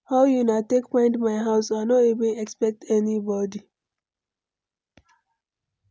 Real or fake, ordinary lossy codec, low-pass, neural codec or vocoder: real; none; none; none